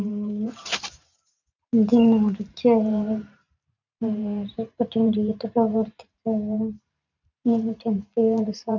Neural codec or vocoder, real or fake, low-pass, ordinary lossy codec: vocoder, 44.1 kHz, 128 mel bands every 512 samples, BigVGAN v2; fake; 7.2 kHz; none